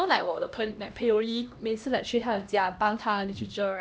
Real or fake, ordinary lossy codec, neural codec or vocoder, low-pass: fake; none; codec, 16 kHz, 1 kbps, X-Codec, HuBERT features, trained on LibriSpeech; none